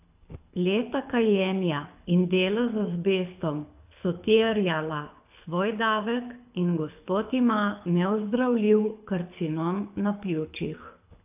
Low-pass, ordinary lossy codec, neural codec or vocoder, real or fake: 3.6 kHz; AAC, 32 kbps; codec, 24 kHz, 6 kbps, HILCodec; fake